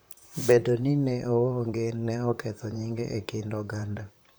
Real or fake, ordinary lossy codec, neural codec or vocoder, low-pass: fake; none; vocoder, 44.1 kHz, 128 mel bands, Pupu-Vocoder; none